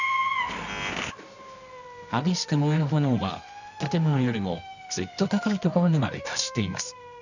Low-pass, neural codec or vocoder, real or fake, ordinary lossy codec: 7.2 kHz; codec, 24 kHz, 0.9 kbps, WavTokenizer, medium music audio release; fake; none